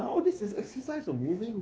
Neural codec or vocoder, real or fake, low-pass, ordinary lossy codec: codec, 16 kHz, 2 kbps, X-Codec, WavLM features, trained on Multilingual LibriSpeech; fake; none; none